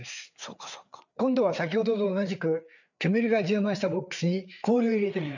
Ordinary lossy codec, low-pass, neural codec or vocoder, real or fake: none; 7.2 kHz; codec, 16 kHz, 4 kbps, FreqCodec, larger model; fake